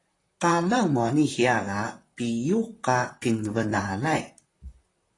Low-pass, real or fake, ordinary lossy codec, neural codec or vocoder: 10.8 kHz; fake; AAC, 32 kbps; vocoder, 44.1 kHz, 128 mel bands, Pupu-Vocoder